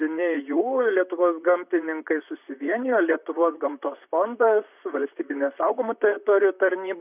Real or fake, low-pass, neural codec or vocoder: fake; 3.6 kHz; vocoder, 44.1 kHz, 128 mel bands, Pupu-Vocoder